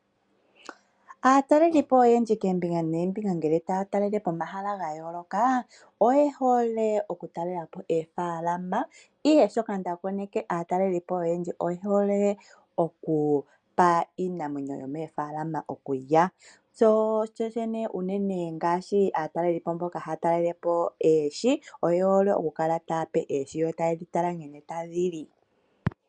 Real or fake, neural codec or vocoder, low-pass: real; none; 9.9 kHz